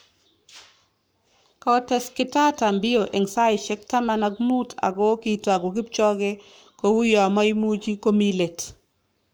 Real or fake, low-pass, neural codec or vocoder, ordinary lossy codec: fake; none; codec, 44.1 kHz, 7.8 kbps, Pupu-Codec; none